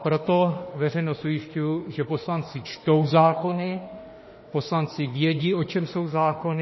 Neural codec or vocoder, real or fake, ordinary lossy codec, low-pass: autoencoder, 48 kHz, 32 numbers a frame, DAC-VAE, trained on Japanese speech; fake; MP3, 24 kbps; 7.2 kHz